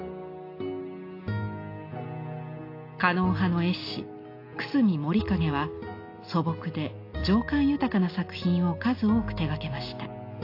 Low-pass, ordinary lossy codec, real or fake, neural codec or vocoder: 5.4 kHz; AAC, 32 kbps; real; none